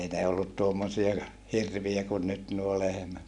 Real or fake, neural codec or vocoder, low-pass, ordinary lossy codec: real; none; 10.8 kHz; none